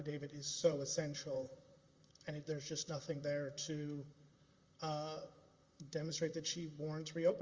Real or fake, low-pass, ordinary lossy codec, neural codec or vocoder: fake; 7.2 kHz; Opus, 32 kbps; vocoder, 44.1 kHz, 128 mel bands, Pupu-Vocoder